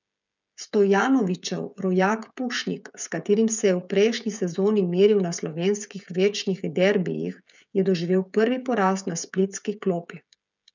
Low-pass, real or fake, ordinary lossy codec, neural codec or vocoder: 7.2 kHz; fake; none; codec, 16 kHz, 16 kbps, FreqCodec, smaller model